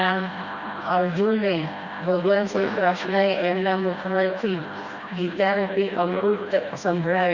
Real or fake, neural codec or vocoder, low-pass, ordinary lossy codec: fake; codec, 16 kHz, 1 kbps, FreqCodec, smaller model; 7.2 kHz; Opus, 64 kbps